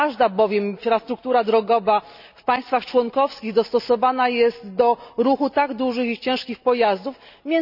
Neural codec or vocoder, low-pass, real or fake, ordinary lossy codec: none; 5.4 kHz; real; none